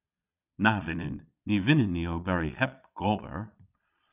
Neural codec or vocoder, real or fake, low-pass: vocoder, 22.05 kHz, 80 mel bands, Vocos; fake; 3.6 kHz